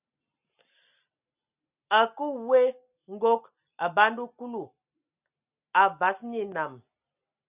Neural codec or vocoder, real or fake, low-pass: none; real; 3.6 kHz